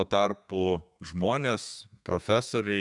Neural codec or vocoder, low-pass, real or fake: codec, 32 kHz, 1.9 kbps, SNAC; 10.8 kHz; fake